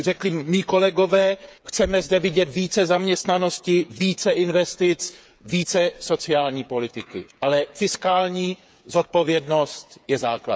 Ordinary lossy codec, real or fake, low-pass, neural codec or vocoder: none; fake; none; codec, 16 kHz, 8 kbps, FreqCodec, smaller model